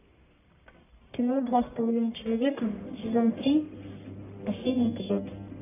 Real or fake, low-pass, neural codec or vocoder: fake; 3.6 kHz; codec, 44.1 kHz, 1.7 kbps, Pupu-Codec